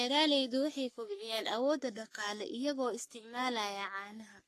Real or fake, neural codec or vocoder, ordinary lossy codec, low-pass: fake; codec, 44.1 kHz, 3.4 kbps, Pupu-Codec; AAC, 48 kbps; 14.4 kHz